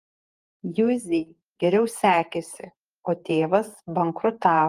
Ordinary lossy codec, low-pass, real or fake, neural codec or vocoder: Opus, 24 kbps; 14.4 kHz; fake; vocoder, 48 kHz, 128 mel bands, Vocos